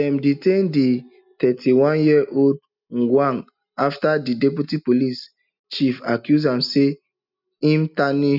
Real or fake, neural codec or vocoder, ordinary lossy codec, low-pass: real; none; none; 5.4 kHz